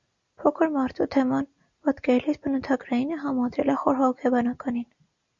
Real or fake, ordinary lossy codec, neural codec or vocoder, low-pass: real; Opus, 64 kbps; none; 7.2 kHz